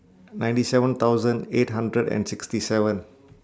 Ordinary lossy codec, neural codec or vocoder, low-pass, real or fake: none; none; none; real